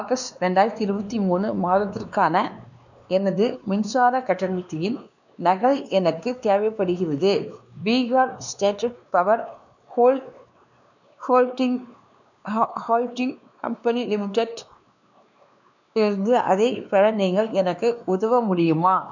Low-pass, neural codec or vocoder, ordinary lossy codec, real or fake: 7.2 kHz; codec, 16 kHz, 2 kbps, X-Codec, WavLM features, trained on Multilingual LibriSpeech; none; fake